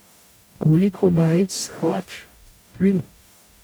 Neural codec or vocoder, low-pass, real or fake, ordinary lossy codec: codec, 44.1 kHz, 0.9 kbps, DAC; none; fake; none